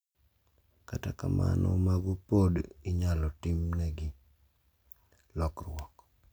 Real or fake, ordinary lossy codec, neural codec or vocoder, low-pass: real; none; none; none